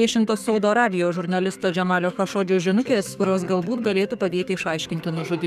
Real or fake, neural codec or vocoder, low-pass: fake; codec, 32 kHz, 1.9 kbps, SNAC; 14.4 kHz